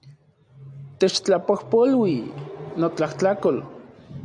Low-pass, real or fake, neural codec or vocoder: 9.9 kHz; real; none